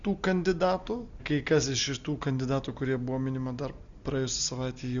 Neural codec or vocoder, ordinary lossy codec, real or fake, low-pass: none; MP3, 96 kbps; real; 7.2 kHz